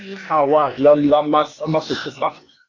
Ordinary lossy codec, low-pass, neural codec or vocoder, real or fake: AAC, 32 kbps; 7.2 kHz; codec, 16 kHz, 0.8 kbps, ZipCodec; fake